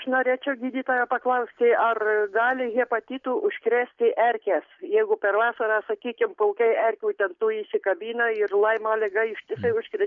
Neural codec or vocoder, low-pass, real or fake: none; 7.2 kHz; real